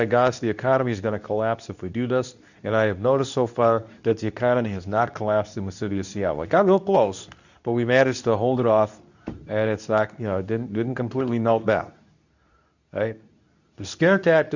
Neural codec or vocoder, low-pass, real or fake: codec, 24 kHz, 0.9 kbps, WavTokenizer, medium speech release version 2; 7.2 kHz; fake